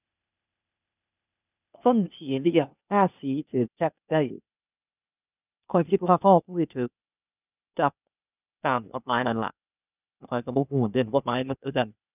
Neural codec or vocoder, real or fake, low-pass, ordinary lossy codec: codec, 16 kHz, 0.8 kbps, ZipCodec; fake; 3.6 kHz; none